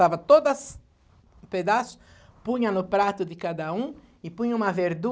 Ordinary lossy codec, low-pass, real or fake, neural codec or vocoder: none; none; real; none